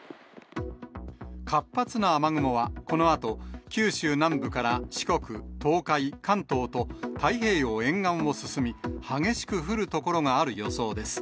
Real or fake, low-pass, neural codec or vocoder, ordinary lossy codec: real; none; none; none